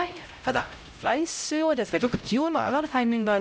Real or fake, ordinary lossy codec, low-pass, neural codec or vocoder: fake; none; none; codec, 16 kHz, 0.5 kbps, X-Codec, HuBERT features, trained on LibriSpeech